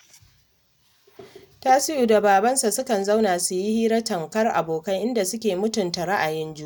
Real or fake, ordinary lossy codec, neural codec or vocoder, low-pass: real; none; none; none